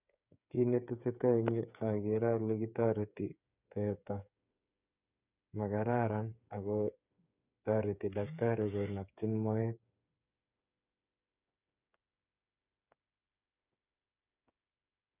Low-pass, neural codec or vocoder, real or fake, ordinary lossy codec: 3.6 kHz; codec, 16 kHz, 8 kbps, FreqCodec, smaller model; fake; none